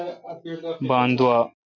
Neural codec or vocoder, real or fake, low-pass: none; real; 7.2 kHz